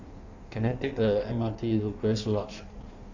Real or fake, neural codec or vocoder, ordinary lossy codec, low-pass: fake; codec, 16 kHz in and 24 kHz out, 1.1 kbps, FireRedTTS-2 codec; none; 7.2 kHz